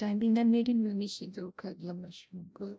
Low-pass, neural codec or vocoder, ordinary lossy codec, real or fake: none; codec, 16 kHz, 0.5 kbps, FreqCodec, larger model; none; fake